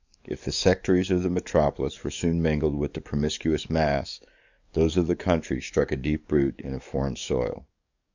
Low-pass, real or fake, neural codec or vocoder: 7.2 kHz; fake; codec, 44.1 kHz, 7.8 kbps, DAC